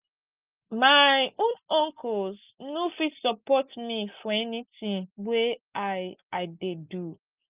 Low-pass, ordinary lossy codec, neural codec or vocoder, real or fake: 3.6 kHz; Opus, 32 kbps; none; real